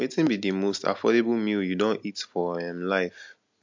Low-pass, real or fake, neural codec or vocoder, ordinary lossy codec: 7.2 kHz; real; none; MP3, 64 kbps